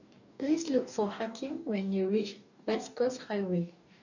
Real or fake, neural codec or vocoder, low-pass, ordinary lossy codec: fake; codec, 44.1 kHz, 2.6 kbps, DAC; 7.2 kHz; none